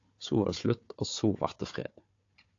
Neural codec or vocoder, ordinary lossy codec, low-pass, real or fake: codec, 16 kHz, 4 kbps, FunCodec, trained on Chinese and English, 50 frames a second; AAC, 48 kbps; 7.2 kHz; fake